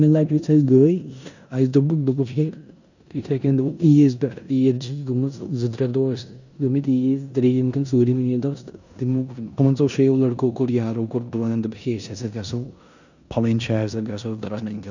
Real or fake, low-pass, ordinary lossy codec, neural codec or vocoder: fake; 7.2 kHz; none; codec, 16 kHz in and 24 kHz out, 0.9 kbps, LongCat-Audio-Codec, four codebook decoder